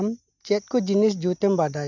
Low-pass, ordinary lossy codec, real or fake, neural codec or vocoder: 7.2 kHz; none; real; none